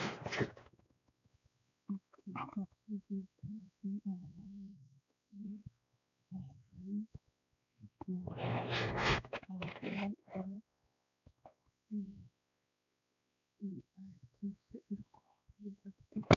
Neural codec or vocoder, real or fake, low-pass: codec, 16 kHz, 2 kbps, X-Codec, WavLM features, trained on Multilingual LibriSpeech; fake; 7.2 kHz